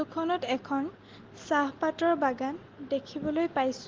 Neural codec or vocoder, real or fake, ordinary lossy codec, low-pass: none; real; Opus, 16 kbps; 7.2 kHz